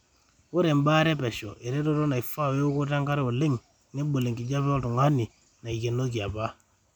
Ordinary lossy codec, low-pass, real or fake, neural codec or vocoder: none; 19.8 kHz; real; none